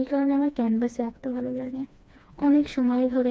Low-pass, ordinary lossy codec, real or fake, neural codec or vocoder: none; none; fake; codec, 16 kHz, 2 kbps, FreqCodec, smaller model